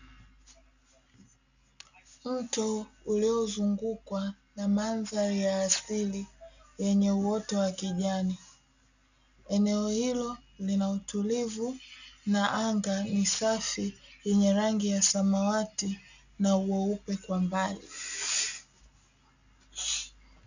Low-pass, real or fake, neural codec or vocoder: 7.2 kHz; real; none